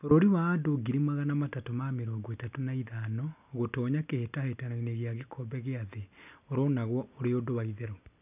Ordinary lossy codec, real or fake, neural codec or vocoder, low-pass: none; real; none; 3.6 kHz